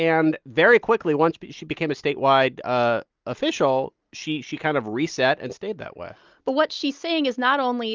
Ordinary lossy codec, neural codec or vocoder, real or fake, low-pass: Opus, 24 kbps; none; real; 7.2 kHz